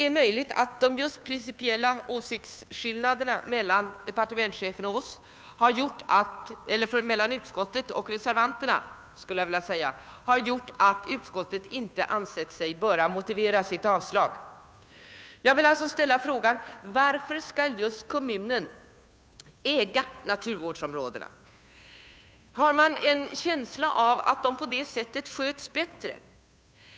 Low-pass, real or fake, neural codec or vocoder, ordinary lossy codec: none; fake; codec, 16 kHz, 2 kbps, FunCodec, trained on Chinese and English, 25 frames a second; none